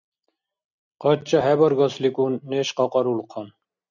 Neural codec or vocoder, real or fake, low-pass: none; real; 7.2 kHz